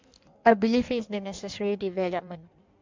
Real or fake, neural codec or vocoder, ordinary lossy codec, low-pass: fake; codec, 16 kHz in and 24 kHz out, 1.1 kbps, FireRedTTS-2 codec; MP3, 64 kbps; 7.2 kHz